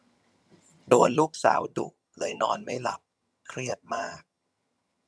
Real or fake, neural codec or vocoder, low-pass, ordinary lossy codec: fake; vocoder, 22.05 kHz, 80 mel bands, HiFi-GAN; none; none